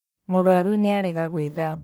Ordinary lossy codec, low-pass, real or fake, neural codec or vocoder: none; none; fake; codec, 44.1 kHz, 1.7 kbps, Pupu-Codec